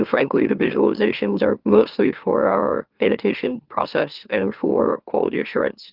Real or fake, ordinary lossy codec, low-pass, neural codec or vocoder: fake; Opus, 32 kbps; 5.4 kHz; autoencoder, 44.1 kHz, a latent of 192 numbers a frame, MeloTTS